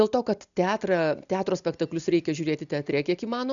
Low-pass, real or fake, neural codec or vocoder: 7.2 kHz; real; none